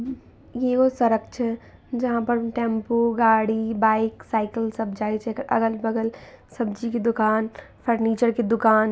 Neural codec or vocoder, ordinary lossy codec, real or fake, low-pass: none; none; real; none